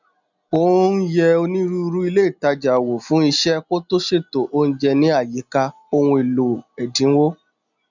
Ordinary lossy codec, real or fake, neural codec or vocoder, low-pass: none; real; none; 7.2 kHz